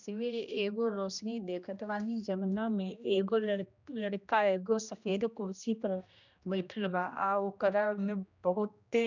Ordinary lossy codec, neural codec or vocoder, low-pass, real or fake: none; codec, 16 kHz, 1 kbps, X-Codec, HuBERT features, trained on general audio; 7.2 kHz; fake